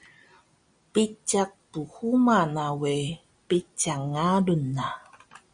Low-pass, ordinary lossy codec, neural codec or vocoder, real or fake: 9.9 kHz; Opus, 64 kbps; none; real